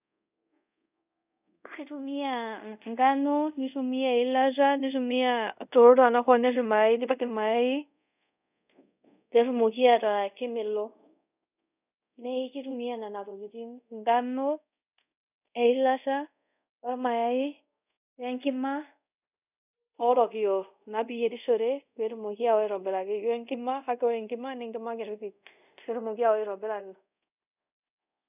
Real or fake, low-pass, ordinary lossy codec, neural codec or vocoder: fake; 3.6 kHz; none; codec, 24 kHz, 0.5 kbps, DualCodec